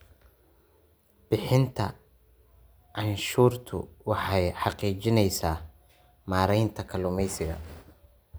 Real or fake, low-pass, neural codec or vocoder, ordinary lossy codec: real; none; none; none